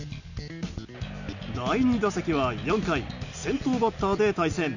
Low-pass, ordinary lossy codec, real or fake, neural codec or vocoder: 7.2 kHz; none; real; none